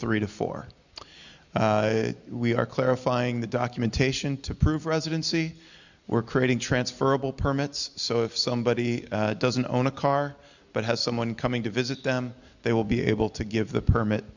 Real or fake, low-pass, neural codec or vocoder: real; 7.2 kHz; none